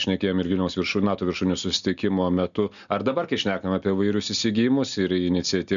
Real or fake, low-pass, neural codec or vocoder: real; 7.2 kHz; none